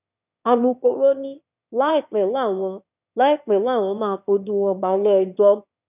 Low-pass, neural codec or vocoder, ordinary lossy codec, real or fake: 3.6 kHz; autoencoder, 22.05 kHz, a latent of 192 numbers a frame, VITS, trained on one speaker; none; fake